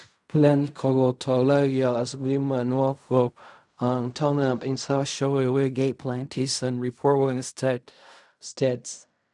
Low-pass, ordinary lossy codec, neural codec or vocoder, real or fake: 10.8 kHz; none; codec, 16 kHz in and 24 kHz out, 0.4 kbps, LongCat-Audio-Codec, fine tuned four codebook decoder; fake